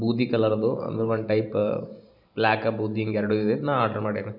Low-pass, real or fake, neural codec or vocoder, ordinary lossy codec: 5.4 kHz; fake; vocoder, 44.1 kHz, 128 mel bands every 512 samples, BigVGAN v2; none